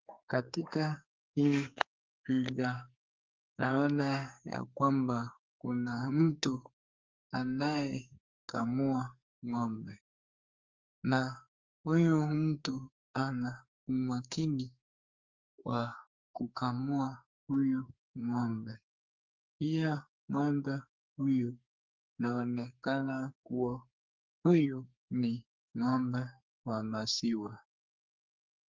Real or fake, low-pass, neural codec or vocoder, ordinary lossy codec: fake; 7.2 kHz; codec, 44.1 kHz, 2.6 kbps, SNAC; Opus, 32 kbps